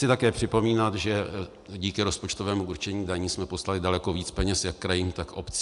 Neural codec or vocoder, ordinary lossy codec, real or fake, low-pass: none; AAC, 96 kbps; real; 10.8 kHz